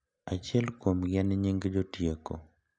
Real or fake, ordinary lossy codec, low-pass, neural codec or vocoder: real; none; 9.9 kHz; none